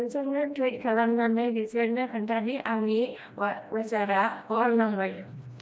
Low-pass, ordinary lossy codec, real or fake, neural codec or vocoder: none; none; fake; codec, 16 kHz, 1 kbps, FreqCodec, smaller model